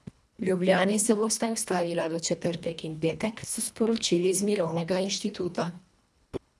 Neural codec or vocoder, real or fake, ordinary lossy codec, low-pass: codec, 24 kHz, 1.5 kbps, HILCodec; fake; none; none